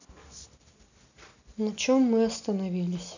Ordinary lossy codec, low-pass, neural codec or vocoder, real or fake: none; 7.2 kHz; none; real